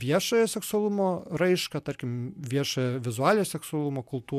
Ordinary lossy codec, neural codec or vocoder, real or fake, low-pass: MP3, 96 kbps; none; real; 14.4 kHz